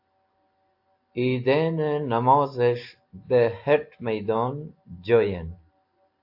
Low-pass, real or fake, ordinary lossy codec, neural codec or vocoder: 5.4 kHz; real; AAC, 48 kbps; none